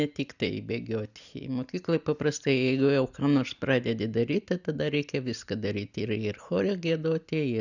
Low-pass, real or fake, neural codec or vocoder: 7.2 kHz; real; none